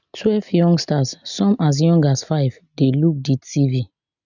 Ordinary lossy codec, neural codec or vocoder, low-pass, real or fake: none; none; 7.2 kHz; real